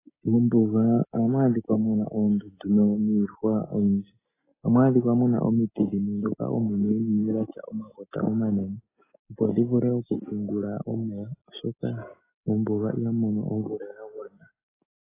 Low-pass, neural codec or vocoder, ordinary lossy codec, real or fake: 3.6 kHz; none; AAC, 16 kbps; real